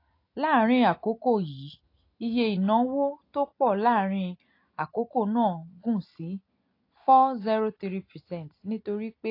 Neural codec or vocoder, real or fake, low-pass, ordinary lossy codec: none; real; 5.4 kHz; AAC, 32 kbps